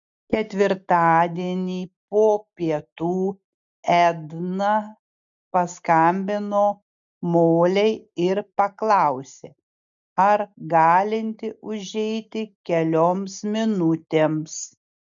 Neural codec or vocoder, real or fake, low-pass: none; real; 7.2 kHz